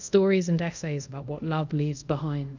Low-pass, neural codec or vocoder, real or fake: 7.2 kHz; codec, 24 kHz, 0.5 kbps, DualCodec; fake